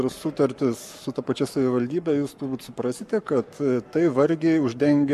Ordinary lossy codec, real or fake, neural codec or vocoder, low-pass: MP3, 64 kbps; fake; codec, 44.1 kHz, 7.8 kbps, Pupu-Codec; 14.4 kHz